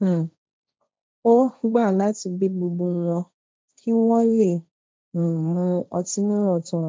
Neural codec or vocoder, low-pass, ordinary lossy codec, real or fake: codec, 16 kHz, 1.1 kbps, Voila-Tokenizer; none; none; fake